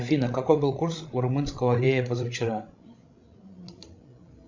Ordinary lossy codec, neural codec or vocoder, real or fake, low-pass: MP3, 64 kbps; codec, 16 kHz, 16 kbps, FreqCodec, larger model; fake; 7.2 kHz